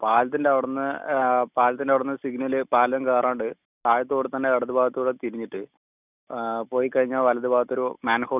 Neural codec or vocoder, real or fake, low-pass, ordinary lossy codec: none; real; 3.6 kHz; none